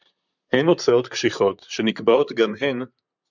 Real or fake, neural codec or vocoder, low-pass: fake; vocoder, 44.1 kHz, 128 mel bands, Pupu-Vocoder; 7.2 kHz